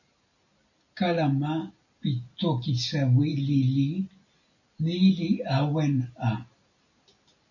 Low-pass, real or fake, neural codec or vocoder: 7.2 kHz; real; none